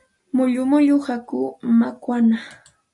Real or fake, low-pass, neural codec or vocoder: real; 10.8 kHz; none